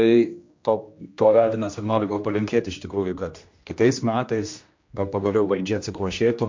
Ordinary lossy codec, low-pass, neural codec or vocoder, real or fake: MP3, 48 kbps; 7.2 kHz; codec, 16 kHz, 1 kbps, X-Codec, HuBERT features, trained on general audio; fake